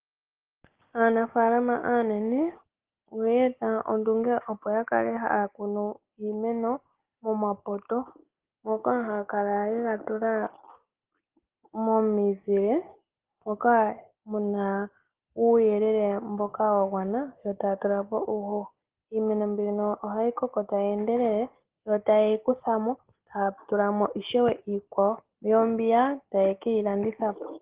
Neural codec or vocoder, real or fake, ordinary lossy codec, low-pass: none; real; Opus, 16 kbps; 3.6 kHz